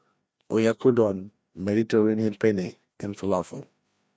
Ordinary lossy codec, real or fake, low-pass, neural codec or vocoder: none; fake; none; codec, 16 kHz, 1 kbps, FreqCodec, larger model